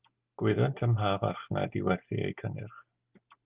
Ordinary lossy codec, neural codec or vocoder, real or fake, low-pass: Opus, 32 kbps; none; real; 3.6 kHz